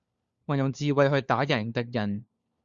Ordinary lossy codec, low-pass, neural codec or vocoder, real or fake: Opus, 64 kbps; 7.2 kHz; codec, 16 kHz, 4 kbps, FunCodec, trained on LibriTTS, 50 frames a second; fake